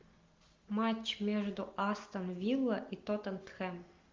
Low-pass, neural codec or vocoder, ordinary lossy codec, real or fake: 7.2 kHz; none; Opus, 24 kbps; real